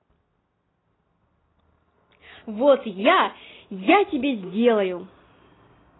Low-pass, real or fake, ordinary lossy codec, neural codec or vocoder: 7.2 kHz; real; AAC, 16 kbps; none